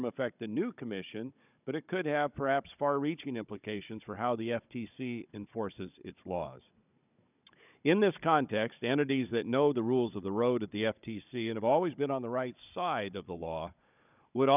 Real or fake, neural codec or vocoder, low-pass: fake; codec, 16 kHz, 16 kbps, FunCodec, trained on Chinese and English, 50 frames a second; 3.6 kHz